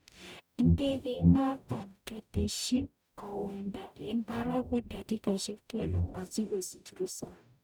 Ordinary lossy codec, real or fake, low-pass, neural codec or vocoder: none; fake; none; codec, 44.1 kHz, 0.9 kbps, DAC